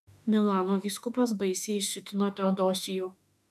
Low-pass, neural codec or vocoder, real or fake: 14.4 kHz; autoencoder, 48 kHz, 32 numbers a frame, DAC-VAE, trained on Japanese speech; fake